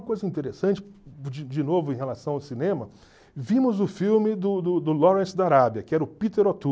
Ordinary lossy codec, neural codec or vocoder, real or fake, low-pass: none; none; real; none